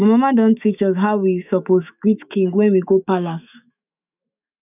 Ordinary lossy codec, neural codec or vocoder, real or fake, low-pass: none; autoencoder, 48 kHz, 128 numbers a frame, DAC-VAE, trained on Japanese speech; fake; 3.6 kHz